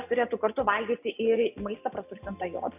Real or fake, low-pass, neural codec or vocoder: real; 3.6 kHz; none